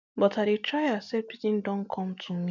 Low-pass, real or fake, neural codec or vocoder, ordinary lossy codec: 7.2 kHz; real; none; none